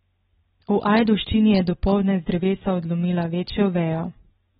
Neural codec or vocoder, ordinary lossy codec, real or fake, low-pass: none; AAC, 16 kbps; real; 7.2 kHz